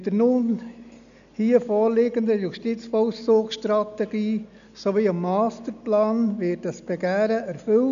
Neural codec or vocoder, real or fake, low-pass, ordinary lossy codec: none; real; 7.2 kHz; none